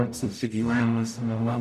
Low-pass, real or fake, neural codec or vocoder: 14.4 kHz; fake; codec, 44.1 kHz, 0.9 kbps, DAC